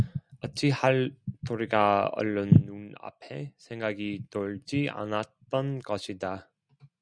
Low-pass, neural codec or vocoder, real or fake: 9.9 kHz; none; real